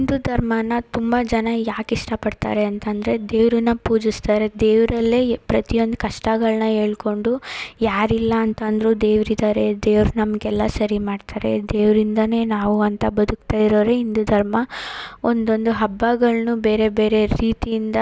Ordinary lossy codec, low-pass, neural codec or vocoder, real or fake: none; none; none; real